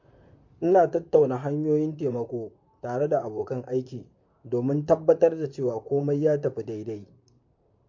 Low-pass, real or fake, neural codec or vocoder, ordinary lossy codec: 7.2 kHz; fake; vocoder, 44.1 kHz, 128 mel bands, Pupu-Vocoder; MP3, 48 kbps